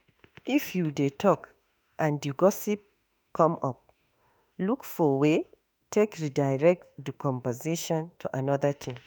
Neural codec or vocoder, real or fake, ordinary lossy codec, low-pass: autoencoder, 48 kHz, 32 numbers a frame, DAC-VAE, trained on Japanese speech; fake; none; none